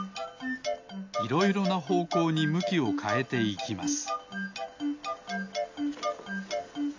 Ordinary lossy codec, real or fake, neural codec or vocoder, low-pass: AAC, 48 kbps; real; none; 7.2 kHz